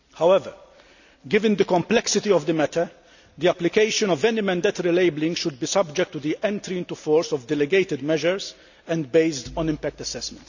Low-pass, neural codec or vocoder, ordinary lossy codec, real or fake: 7.2 kHz; none; none; real